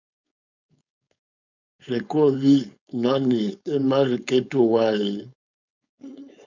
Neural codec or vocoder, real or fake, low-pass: codec, 16 kHz, 4.8 kbps, FACodec; fake; 7.2 kHz